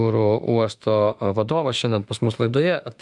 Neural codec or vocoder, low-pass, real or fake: autoencoder, 48 kHz, 32 numbers a frame, DAC-VAE, trained on Japanese speech; 10.8 kHz; fake